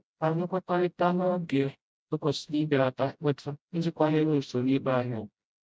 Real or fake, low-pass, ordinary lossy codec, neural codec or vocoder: fake; none; none; codec, 16 kHz, 0.5 kbps, FreqCodec, smaller model